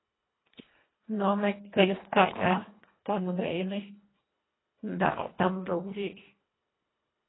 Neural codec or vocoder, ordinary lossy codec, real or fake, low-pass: codec, 24 kHz, 1.5 kbps, HILCodec; AAC, 16 kbps; fake; 7.2 kHz